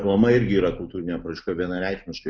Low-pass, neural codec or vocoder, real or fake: 7.2 kHz; none; real